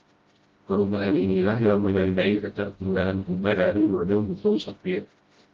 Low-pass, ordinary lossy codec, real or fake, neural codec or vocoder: 7.2 kHz; Opus, 32 kbps; fake; codec, 16 kHz, 0.5 kbps, FreqCodec, smaller model